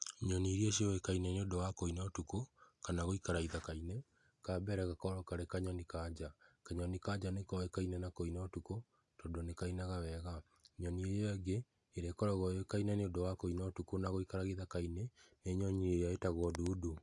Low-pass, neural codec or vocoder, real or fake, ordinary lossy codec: 10.8 kHz; none; real; AAC, 64 kbps